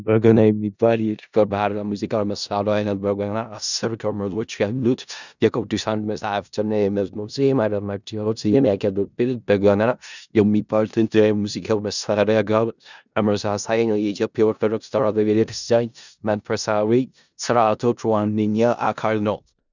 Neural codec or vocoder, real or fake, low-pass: codec, 16 kHz in and 24 kHz out, 0.4 kbps, LongCat-Audio-Codec, four codebook decoder; fake; 7.2 kHz